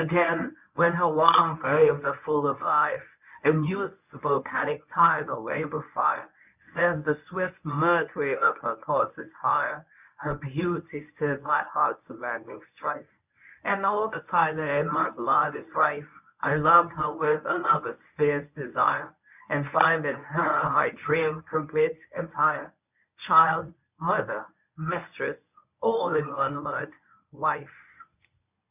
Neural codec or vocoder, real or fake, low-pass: codec, 24 kHz, 0.9 kbps, WavTokenizer, medium speech release version 1; fake; 3.6 kHz